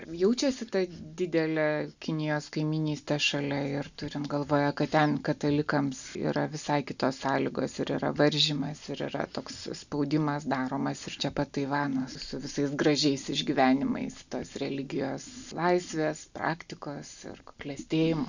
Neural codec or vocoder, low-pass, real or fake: none; 7.2 kHz; real